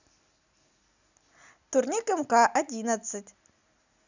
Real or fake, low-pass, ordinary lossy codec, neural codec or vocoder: real; 7.2 kHz; none; none